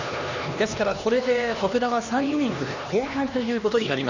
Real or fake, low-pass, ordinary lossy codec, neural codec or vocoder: fake; 7.2 kHz; none; codec, 16 kHz, 2 kbps, X-Codec, HuBERT features, trained on LibriSpeech